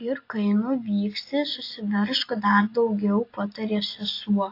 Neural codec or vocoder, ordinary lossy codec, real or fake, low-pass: none; AAC, 32 kbps; real; 5.4 kHz